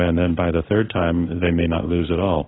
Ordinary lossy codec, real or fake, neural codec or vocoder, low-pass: AAC, 16 kbps; fake; codec, 16 kHz in and 24 kHz out, 1 kbps, XY-Tokenizer; 7.2 kHz